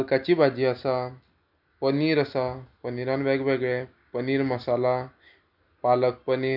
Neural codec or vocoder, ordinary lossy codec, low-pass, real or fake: none; none; 5.4 kHz; real